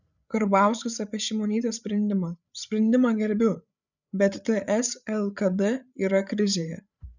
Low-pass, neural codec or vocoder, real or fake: 7.2 kHz; codec, 16 kHz, 8 kbps, FreqCodec, larger model; fake